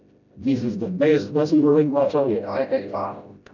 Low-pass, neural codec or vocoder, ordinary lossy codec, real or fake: 7.2 kHz; codec, 16 kHz, 0.5 kbps, FreqCodec, smaller model; none; fake